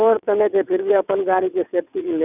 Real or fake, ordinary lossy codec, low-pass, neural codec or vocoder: fake; none; 3.6 kHz; vocoder, 22.05 kHz, 80 mel bands, WaveNeXt